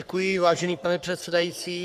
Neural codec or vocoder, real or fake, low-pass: codec, 44.1 kHz, 3.4 kbps, Pupu-Codec; fake; 14.4 kHz